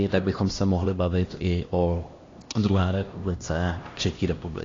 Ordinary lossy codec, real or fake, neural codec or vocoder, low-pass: AAC, 32 kbps; fake; codec, 16 kHz, 1 kbps, X-Codec, HuBERT features, trained on LibriSpeech; 7.2 kHz